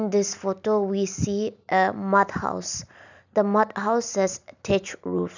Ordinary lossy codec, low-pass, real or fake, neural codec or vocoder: none; 7.2 kHz; real; none